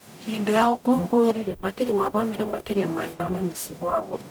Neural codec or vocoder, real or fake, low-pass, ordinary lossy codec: codec, 44.1 kHz, 0.9 kbps, DAC; fake; none; none